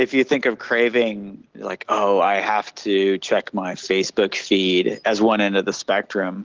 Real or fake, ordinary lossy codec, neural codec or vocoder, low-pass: real; Opus, 16 kbps; none; 7.2 kHz